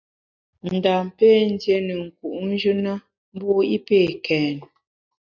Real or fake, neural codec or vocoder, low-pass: real; none; 7.2 kHz